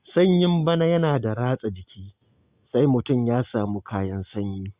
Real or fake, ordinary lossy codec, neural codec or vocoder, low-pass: real; Opus, 64 kbps; none; 3.6 kHz